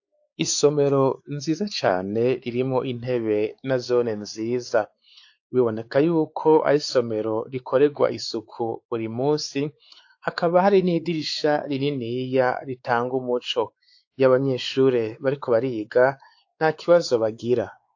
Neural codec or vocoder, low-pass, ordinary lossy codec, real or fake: codec, 16 kHz, 4 kbps, X-Codec, WavLM features, trained on Multilingual LibriSpeech; 7.2 kHz; AAC, 48 kbps; fake